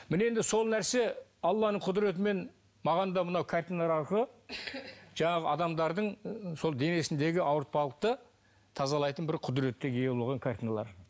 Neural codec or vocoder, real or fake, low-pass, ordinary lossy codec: none; real; none; none